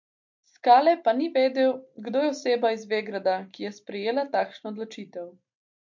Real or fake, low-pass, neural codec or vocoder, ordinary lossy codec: real; 7.2 kHz; none; MP3, 48 kbps